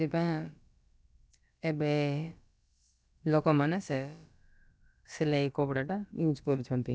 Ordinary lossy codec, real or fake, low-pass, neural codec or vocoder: none; fake; none; codec, 16 kHz, about 1 kbps, DyCAST, with the encoder's durations